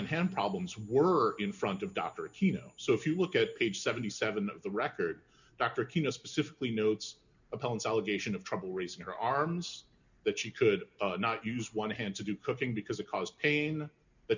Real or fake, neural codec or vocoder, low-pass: real; none; 7.2 kHz